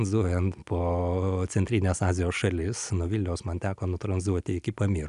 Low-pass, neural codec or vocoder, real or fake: 10.8 kHz; none; real